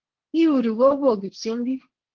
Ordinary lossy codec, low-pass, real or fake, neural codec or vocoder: Opus, 16 kbps; 7.2 kHz; fake; codec, 16 kHz, 1.1 kbps, Voila-Tokenizer